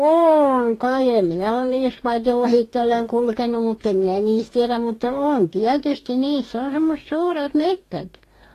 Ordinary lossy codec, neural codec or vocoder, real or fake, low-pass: AAC, 48 kbps; codec, 44.1 kHz, 2.6 kbps, DAC; fake; 14.4 kHz